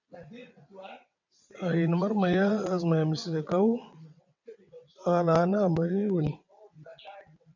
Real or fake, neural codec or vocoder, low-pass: fake; vocoder, 22.05 kHz, 80 mel bands, WaveNeXt; 7.2 kHz